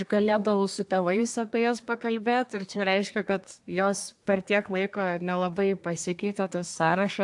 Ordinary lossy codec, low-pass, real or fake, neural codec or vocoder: AAC, 64 kbps; 10.8 kHz; fake; codec, 24 kHz, 1 kbps, SNAC